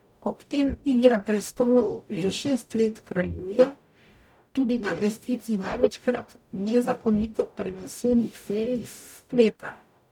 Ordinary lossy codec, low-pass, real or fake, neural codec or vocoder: none; 19.8 kHz; fake; codec, 44.1 kHz, 0.9 kbps, DAC